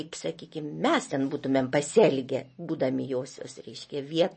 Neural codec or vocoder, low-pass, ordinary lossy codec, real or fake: none; 10.8 kHz; MP3, 32 kbps; real